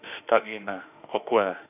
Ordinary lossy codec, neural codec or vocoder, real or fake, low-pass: none; autoencoder, 48 kHz, 32 numbers a frame, DAC-VAE, trained on Japanese speech; fake; 3.6 kHz